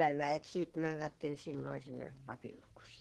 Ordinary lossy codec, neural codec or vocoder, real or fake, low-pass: Opus, 16 kbps; codec, 24 kHz, 1 kbps, SNAC; fake; 10.8 kHz